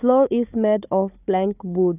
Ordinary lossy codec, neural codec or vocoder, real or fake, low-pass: none; codec, 16 kHz, 4 kbps, FunCodec, trained on Chinese and English, 50 frames a second; fake; 3.6 kHz